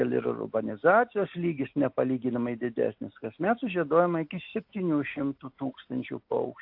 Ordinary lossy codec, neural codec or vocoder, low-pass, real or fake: AAC, 48 kbps; none; 5.4 kHz; real